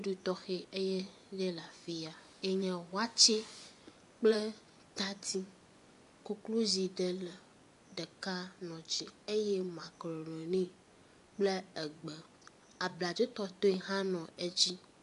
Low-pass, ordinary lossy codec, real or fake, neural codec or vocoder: 10.8 kHz; AAC, 64 kbps; fake; vocoder, 24 kHz, 100 mel bands, Vocos